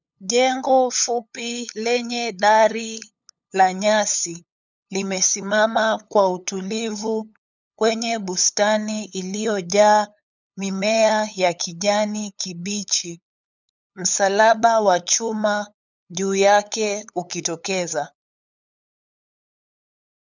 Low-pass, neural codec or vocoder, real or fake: 7.2 kHz; codec, 16 kHz, 8 kbps, FunCodec, trained on LibriTTS, 25 frames a second; fake